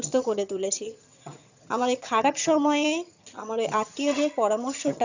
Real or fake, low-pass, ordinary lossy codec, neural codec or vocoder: fake; 7.2 kHz; none; vocoder, 22.05 kHz, 80 mel bands, HiFi-GAN